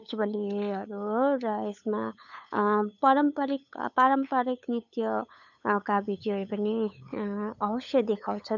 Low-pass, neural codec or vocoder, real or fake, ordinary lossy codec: 7.2 kHz; codec, 16 kHz, 8 kbps, FunCodec, trained on LibriTTS, 25 frames a second; fake; none